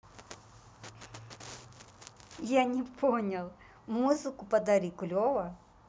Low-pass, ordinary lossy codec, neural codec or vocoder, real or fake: none; none; none; real